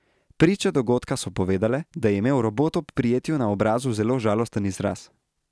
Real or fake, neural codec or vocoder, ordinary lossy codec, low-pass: real; none; none; none